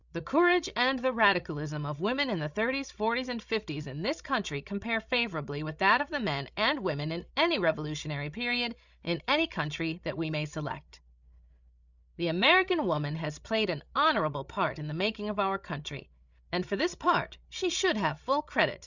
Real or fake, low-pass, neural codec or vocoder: fake; 7.2 kHz; codec, 16 kHz, 16 kbps, FreqCodec, larger model